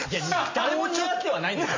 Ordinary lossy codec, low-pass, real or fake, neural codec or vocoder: AAC, 32 kbps; 7.2 kHz; real; none